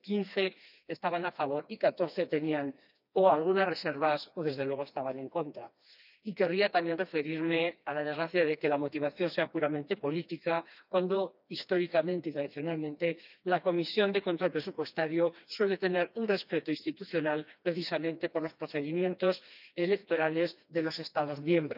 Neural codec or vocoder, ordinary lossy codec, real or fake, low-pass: codec, 16 kHz, 2 kbps, FreqCodec, smaller model; none; fake; 5.4 kHz